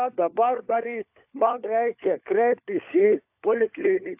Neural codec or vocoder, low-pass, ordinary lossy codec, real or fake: codec, 16 kHz, 4 kbps, FunCodec, trained on LibriTTS, 50 frames a second; 3.6 kHz; AAC, 32 kbps; fake